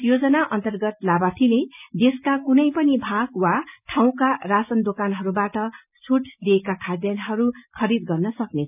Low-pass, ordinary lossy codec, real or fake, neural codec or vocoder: 3.6 kHz; none; real; none